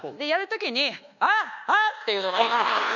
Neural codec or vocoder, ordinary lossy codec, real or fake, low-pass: codec, 24 kHz, 1.2 kbps, DualCodec; none; fake; 7.2 kHz